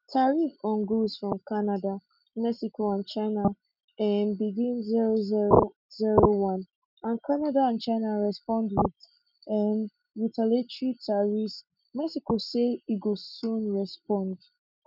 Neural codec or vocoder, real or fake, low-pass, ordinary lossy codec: none; real; 5.4 kHz; none